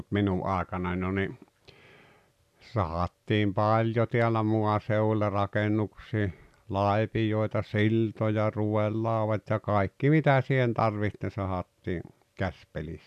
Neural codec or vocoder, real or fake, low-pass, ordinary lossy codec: none; real; 14.4 kHz; none